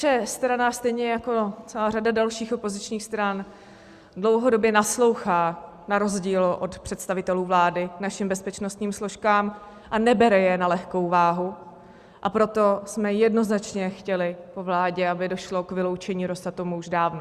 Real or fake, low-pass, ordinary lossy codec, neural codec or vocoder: real; 14.4 kHz; Opus, 64 kbps; none